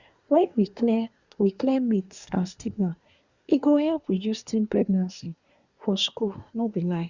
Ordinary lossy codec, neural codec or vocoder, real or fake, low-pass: Opus, 64 kbps; codec, 24 kHz, 1 kbps, SNAC; fake; 7.2 kHz